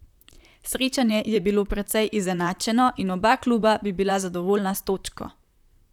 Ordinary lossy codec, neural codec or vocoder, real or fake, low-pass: none; vocoder, 44.1 kHz, 128 mel bands, Pupu-Vocoder; fake; 19.8 kHz